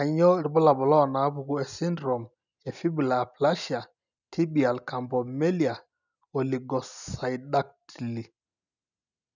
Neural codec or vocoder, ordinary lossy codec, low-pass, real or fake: none; none; 7.2 kHz; real